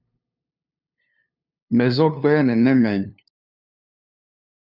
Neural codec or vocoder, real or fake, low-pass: codec, 16 kHz, 2 kbps, FunCodec, trained on LibriTTS, 25 frames a second; fake; 5.4 kHz